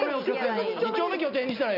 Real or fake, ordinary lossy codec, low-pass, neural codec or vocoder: real; none; 5.4 kHz; none